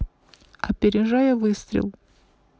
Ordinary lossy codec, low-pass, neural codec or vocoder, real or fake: none; none; none; real